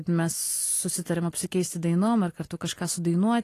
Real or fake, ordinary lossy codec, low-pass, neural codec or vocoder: real; AAC, 48 kbps; 14.4 kHz; none